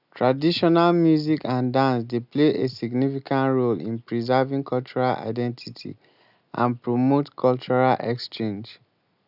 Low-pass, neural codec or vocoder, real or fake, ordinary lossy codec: 5.4 kHz; none; real; none